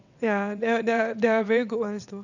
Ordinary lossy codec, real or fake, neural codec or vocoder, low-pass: none; fake; vocoder, 22.05 kHz, 80 mel bands, WaveNeXt; 7.2 kHz